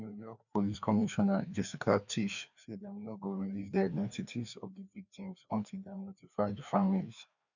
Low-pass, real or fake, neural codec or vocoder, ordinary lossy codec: 7.2 kHz; fake; codec, 16 kHz, 2 kbps, FreqCodec, larger model; none